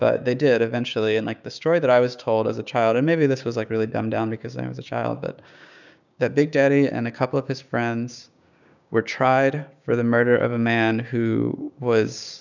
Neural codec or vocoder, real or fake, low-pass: codec, 16 kHz, 6 kbps, DAC; fake; 7.2 kHz